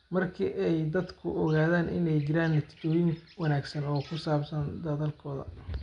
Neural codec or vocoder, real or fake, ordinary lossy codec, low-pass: none; real; none; 9.9 kHz